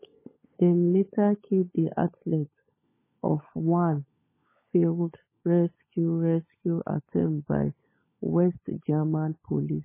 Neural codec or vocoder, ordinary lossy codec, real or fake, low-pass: codec, 16 kHz, 16 kbps, FunCodec, trained on LibriTTS, 50 frames a second; MP3, 16 kbps; fake; 3.6 kHz